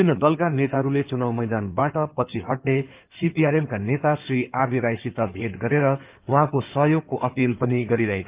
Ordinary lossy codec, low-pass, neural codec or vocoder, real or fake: Opus, 24 kbps; 3.6 kHz; codec, 16 kHz in and 24 kHz out, 2.2 kbps, FireRedTTS-2 codec; fake